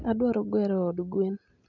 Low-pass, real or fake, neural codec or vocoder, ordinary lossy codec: 7.2 kHz; real; none; none